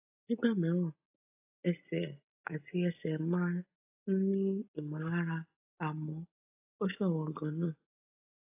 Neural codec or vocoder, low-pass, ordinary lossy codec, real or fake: none; 3.6 kHz; AAC, 32 kbps; real